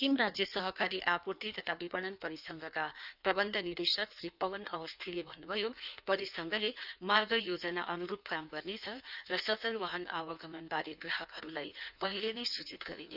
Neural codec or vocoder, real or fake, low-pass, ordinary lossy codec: codec, 16 kHz in and 24 kHz out, 1.1 kbps, FireRedTTS-2 codec; fake; 5.4 kHz; none